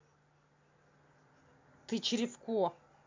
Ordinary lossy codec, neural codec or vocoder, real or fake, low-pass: MP3, 64 kbps; none; real; 7.2 kHz